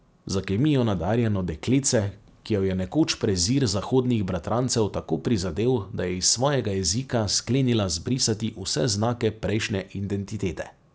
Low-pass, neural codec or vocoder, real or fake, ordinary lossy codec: none; none; real; none